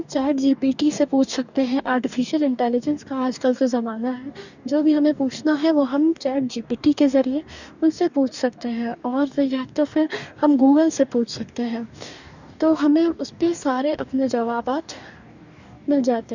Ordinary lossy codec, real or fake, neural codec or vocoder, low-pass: none; fake; codec, 44.1 kHz, 2.6 kbps, DAC; 7.2 kHz